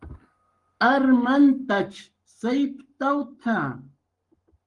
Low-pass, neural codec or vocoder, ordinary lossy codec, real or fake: 10.8 kHz; codec, 44.1 kHz, 7.8 kbps, Pupu-Codec; Opus, 24 kbps; fake